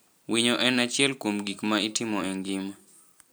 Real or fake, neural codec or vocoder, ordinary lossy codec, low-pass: real; none; none; none